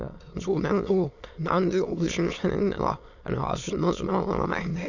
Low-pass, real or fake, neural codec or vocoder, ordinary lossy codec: 7.2 kHz; fake; autoencoder, 22.05 kHz, a latent of 192 numbers a frame, VITS, trained on many speakers; none